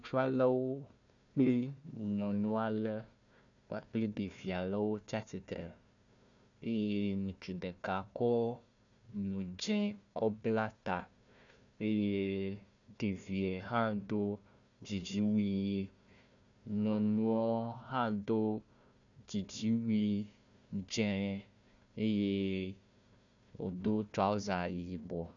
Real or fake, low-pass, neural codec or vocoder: fake; 7.2 kHz; codec, 16 kHz, 1 kbps, FunCodec, trained on Chinese and English, 50 frames a second